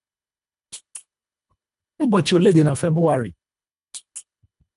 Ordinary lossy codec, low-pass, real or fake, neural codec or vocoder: none; 10.8 kHz; fake; codec, 24 kHz, 1.5 kbps, HILCodec